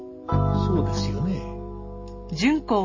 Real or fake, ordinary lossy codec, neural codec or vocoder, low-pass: real; MP3, 32 kbps; none; 7.2 kHz